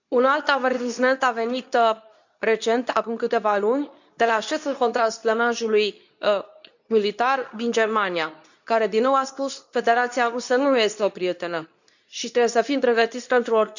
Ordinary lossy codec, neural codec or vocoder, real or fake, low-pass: none; codec, 24 kHz, 0.9 kbps, WavTokenizer, medium speech release version 2; fake; 7.2 kHz